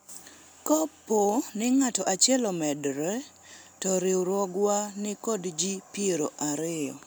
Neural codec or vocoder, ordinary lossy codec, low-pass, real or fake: none; none; none; real